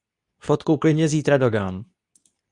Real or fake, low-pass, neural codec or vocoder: fake; 10.8 kHz; codec, 24 kHz, 0.9 kbps, WavTokenizer, medium speech release version 2